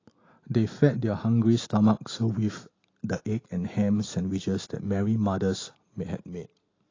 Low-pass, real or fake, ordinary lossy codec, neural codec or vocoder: 7.2 kHz; real; AAC, 32 kbps; none